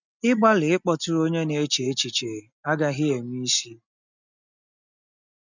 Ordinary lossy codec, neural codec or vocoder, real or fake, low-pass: none; none; real; 7.2 kHz